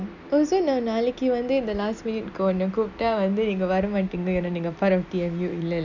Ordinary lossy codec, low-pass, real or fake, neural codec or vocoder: none; 7.2 kHz; real; none